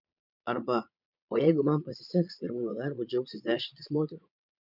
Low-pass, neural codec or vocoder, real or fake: 5.4 kHz; vocoder, 22.05 kHz, 80 mel bands, Vocos; fake